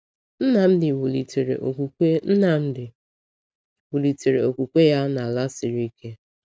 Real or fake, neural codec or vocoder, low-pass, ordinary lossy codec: real; none; none; none